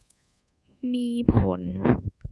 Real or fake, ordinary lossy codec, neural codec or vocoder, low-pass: fake; none; codec, 24 kHz, 1.2 kbps, DualCodec; none